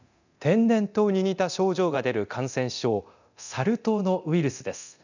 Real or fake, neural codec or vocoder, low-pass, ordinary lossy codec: fake; codec, 24 kHz, 0.9 kbps, DualCodec; 7.2 kHz; none